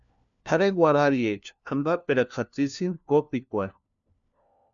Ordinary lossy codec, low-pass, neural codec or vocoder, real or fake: MP3, 96 kbps; 7.2 kHz; codec, 16 kHz, 1 kbps, FunCodec, trained on LibriTTS, 50 frames a second; fake